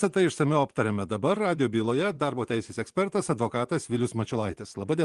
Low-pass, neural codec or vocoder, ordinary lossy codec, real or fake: 10.8 kHz; vocoder, 24 kHz, 100 mel bands, Vocos; Opus, 24 kbps; fake